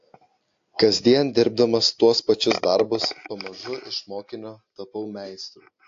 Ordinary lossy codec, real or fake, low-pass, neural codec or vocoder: AAC, 48 kbps; real; 7.2 kHz; none